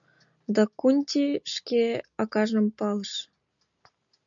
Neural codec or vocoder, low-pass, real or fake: none; 7.2 kHz; real